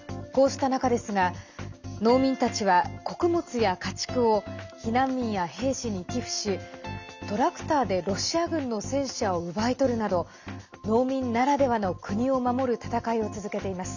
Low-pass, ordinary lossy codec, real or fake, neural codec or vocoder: 7.2 kHz; none; real; none